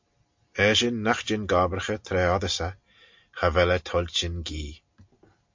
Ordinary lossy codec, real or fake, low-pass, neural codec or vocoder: MP3, 48 kbps; real; 7.2 kHz; none